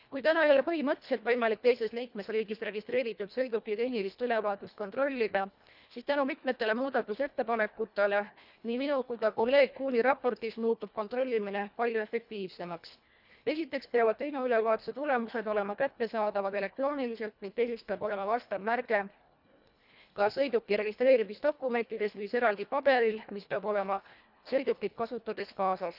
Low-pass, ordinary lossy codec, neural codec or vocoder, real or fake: 5.4 kHz; none; codec, 24 kHz, 1.5 kbps, HILCodec; fake